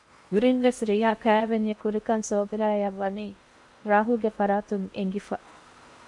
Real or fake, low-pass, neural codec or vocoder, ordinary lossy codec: fake; 10.8 kHz; codec, 16 kHz in and 24 kHz out, 0.8 kbps, FocalCodec, streaming, 65536 codes; MP3, 64 kbps